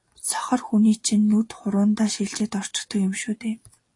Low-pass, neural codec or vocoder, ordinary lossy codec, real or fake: 10.8 kHz; none; AAC, 48 kbps; real